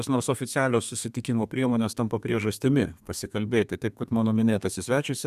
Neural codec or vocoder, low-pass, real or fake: codec, 32 kHz, 1.9 kbps, SNAC; 14.4 kHz; fake